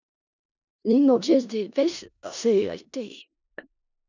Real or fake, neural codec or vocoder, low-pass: fake; codec, 16 kHz in and 24 kHz out, 0.4 kbps, LongCat-Audio-Codec, four codebook decoder; 7.2 kHz